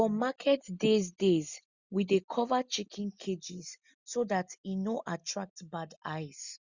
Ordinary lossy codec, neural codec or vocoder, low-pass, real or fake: Opus, 64 kbps; none; 7.2 kHz; real